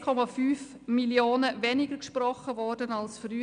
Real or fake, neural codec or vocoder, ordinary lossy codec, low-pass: real; none; MP3, 96 kbps; 9.9 kHz